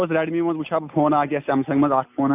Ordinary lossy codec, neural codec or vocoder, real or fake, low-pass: none; none; real; 3.6 kHz